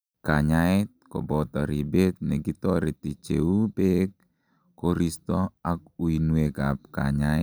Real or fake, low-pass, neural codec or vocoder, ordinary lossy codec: real; none; none; none